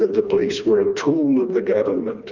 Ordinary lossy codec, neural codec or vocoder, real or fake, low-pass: Opus, 32 kbps; codec, 16 kHz, 2 kbps, FreqCodec, smaller model; fake; 7.2 kHz